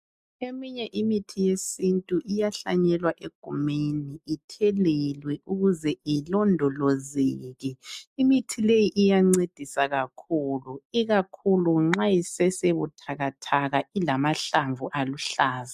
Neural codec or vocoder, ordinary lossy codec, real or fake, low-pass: none; MP3, 96 kbps; real; 14.4 kHz